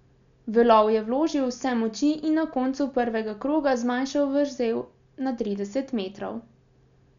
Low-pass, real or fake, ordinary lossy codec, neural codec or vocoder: 7.2 kHz; real; none; none